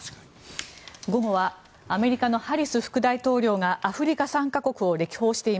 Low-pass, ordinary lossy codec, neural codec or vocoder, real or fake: none; none; none; real